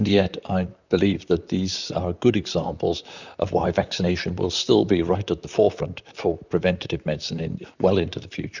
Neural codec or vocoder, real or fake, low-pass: vocoder, 44.1 kHz, 128 mel bands, Pupu-Vocoder; fake; 7.2 kHz